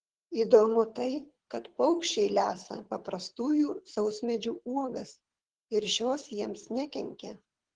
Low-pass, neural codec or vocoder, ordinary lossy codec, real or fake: 9.9 kHz; codec, 24 kHz, 6 kbps, HILCodec; Opus, 16 kbps; fake